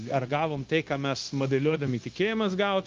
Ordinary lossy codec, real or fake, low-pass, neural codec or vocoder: Opus, 32 kbps; fake; 7.2 kHz; codec, 16 kHz, 0.9 kbps, LongCat-Audio-Codec